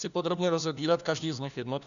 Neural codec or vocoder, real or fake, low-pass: codec, 16 kHz, 1 kbps, FunCodec, trained on LibriTTS, 50 frames a second; fake; 7.2 kHz